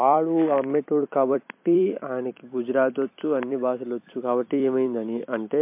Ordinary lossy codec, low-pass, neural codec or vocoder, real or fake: MP3, 24 kbps; 3.6 kHz; vocoder, 44.1 kHz, 128 mel bands every 256 samples, BigVGAN v2; fake